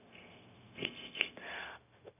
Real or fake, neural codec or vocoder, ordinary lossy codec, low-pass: fake; codec, 16 kHz, 0.4 kbps, LongCat-Audio-Codec; none; 3.6 kHz